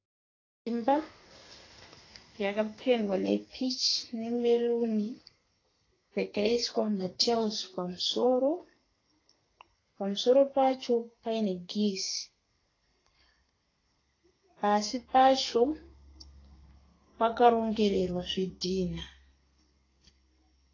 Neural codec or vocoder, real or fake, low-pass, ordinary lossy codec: codec, 32 kHz, 1.9 kbps, SNAC; fake; 7.2 kHz; AAC, 32 kbps